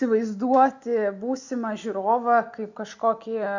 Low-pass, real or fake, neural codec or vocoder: 7.2 kHz; real; none